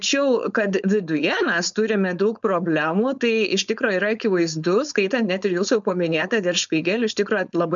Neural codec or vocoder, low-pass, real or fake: codec, 16 kHz, 4.8 kbps, FACodec; 7.2 kHz; fake